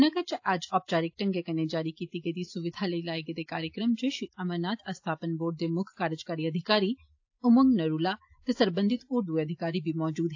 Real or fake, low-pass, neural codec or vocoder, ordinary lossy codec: real; 7.2 kHz; none; AAC, 48 kbps